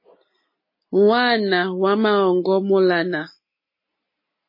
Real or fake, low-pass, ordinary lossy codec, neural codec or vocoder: real; 5.4 kHz; MP3, 24 kbps; none